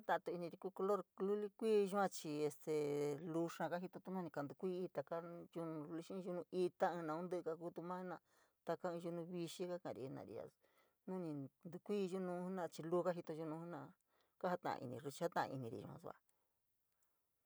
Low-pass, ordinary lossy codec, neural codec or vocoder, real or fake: none; none; none; real